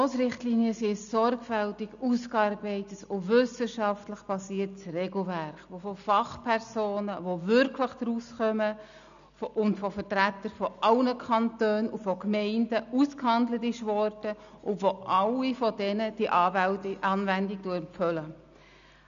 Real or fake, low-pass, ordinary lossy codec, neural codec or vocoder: real; 7.2 kHz; none; none